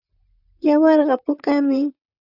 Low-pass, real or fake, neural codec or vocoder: 5.4 kHz; fake; vocoder, 44.1 kHz, 128 mel bands, Pupu-Vocoder